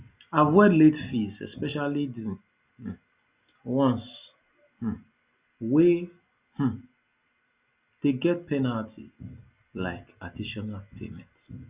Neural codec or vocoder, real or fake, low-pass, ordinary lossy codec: none; real; 3.6 kHz; Opus, 64 kbps